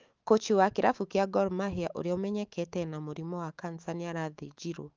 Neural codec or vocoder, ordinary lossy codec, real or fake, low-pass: autoencoder, 48 kHz, 128 numbers a frame, DAC-VAE, trained on Japanese speech; Opus, 32 kbps; fake; 7.2 kHz